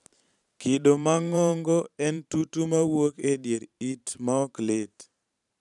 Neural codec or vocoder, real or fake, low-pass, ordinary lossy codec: vocoder, 44.1 kHz, 128 mel bands every 512 samples, BigVGAN v2; fake; 10.8 kHz; none